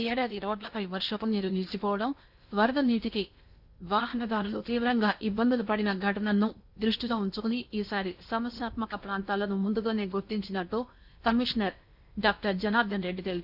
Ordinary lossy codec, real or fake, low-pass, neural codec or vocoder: none; fake; 5.4 kHz; codec, 16 kHz in and 24 kHz out, 0.8 kbps, FocalCodec, streaming, 65536 codes